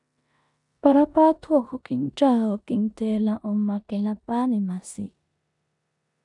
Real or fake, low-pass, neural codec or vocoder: fake; 10.8 kHz; codec, 16 kHz in and 24 kHz out, 0.9 kbps, LongCat-Audio-Codec, four codebook decoder